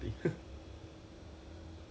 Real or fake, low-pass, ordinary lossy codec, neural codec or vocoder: real; none; none; none